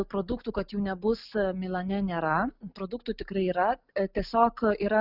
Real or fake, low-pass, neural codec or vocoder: real; 5.4 kHz; none